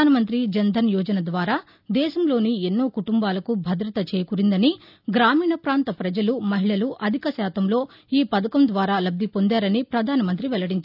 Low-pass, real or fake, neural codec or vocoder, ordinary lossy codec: 5.4 kHz; real; none; none